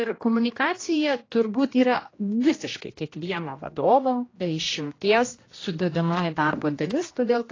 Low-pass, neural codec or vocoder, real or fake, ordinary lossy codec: 7.2 kHz; codec, 16 kHz, 1 kbps, X-Codec, HuBERT features, trained on general audio; fake; AAC, 32 kbps